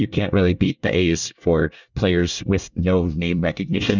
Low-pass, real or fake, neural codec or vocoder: 7.2 kHz; fake; codec, 24 kHz, 1 kbps, SNAC